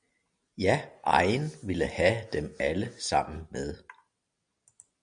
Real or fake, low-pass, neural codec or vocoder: real; 9.9 kHz; none